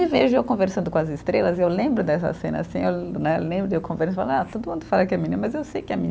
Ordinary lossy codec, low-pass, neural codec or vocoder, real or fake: none; none; none; real